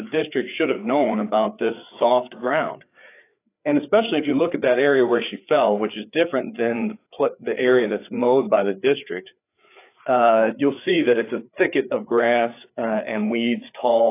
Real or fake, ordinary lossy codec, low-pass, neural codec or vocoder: fake; AAC, 24 kbps; 3.6 kHz; codec, 16 kHz, 4 kbps, FreqCodec, larger model